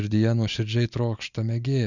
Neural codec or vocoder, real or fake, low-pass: none; real; 7.2 kHz